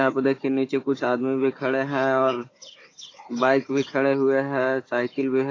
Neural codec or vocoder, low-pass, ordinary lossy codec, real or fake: codec, 16 kHz, 4 kbps, FunCodec, trained on Chinese and English, 50 frames a second; 7.2 kHz; AAC, 32 kbps; fake